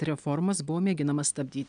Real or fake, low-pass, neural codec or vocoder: real; 9.9 kHz; none